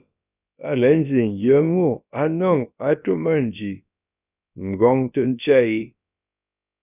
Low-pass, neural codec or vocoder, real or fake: 3.6 kHz; codec, 16 kHz, about 1 kbps, DyCAST, with the encoder's durations; fake